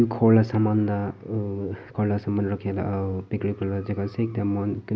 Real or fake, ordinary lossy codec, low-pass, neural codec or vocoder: real; none; none; none